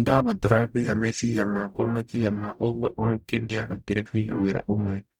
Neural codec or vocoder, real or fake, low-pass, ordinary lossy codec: codec, 44.1 kHz, 0.9 kbps, DAC; fake; 19.8 kHz; none